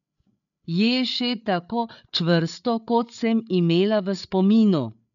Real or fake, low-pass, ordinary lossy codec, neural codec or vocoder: fake; 7.2 kHz; none; codec, 16 kHz, 8 kbps, FreqCodec, larger model